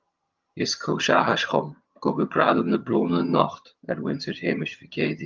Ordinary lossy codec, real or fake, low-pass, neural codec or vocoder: Opus, 24 kbps; fake; 7.2 kHz; vocoder, 22.05 kHz, 80 mel bands, HiFi-GAN